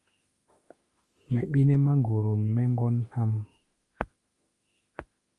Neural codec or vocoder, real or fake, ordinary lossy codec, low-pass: autoencoder, 48 kHz, 32 numbers a frame, DAC-VAE, trained on Japanese speech; fake; Opus, 32 kbps; 10.8 kHz